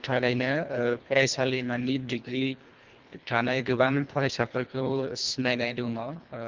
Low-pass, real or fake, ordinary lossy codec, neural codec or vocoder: 7.2 kHz; fake; Opus, 32 kbps; codec, 24 kHz, 1.5 kbps, HILCodec